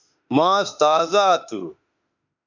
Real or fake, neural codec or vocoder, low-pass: fake; autoencoder, 48 kHz, 32 numbers a frame, DAC-VAE, trained on Japanese speech; 7.2 kHz